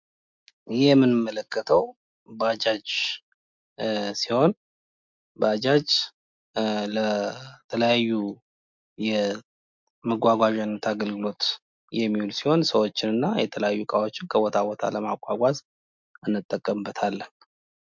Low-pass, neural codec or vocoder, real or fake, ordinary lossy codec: 7.2 kHz; none; real; MP3, 64 kbps